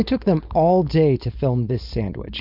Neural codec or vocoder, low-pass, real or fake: codec, 16 kHz, 4.8 kbps, FACodec; 5.4 kHz; fake